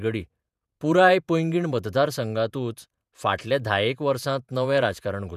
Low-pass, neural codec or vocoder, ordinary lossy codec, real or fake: 14.4 kHz; vocoder, 48 kHz, 128 mel bands, Vocos; none; fake